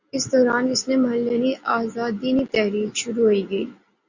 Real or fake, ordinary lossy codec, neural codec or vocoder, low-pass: real; Opus, 64 kbps; none; 7.2 kHz